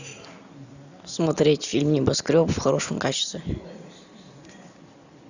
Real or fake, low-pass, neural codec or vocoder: real; 7.2 kHz; none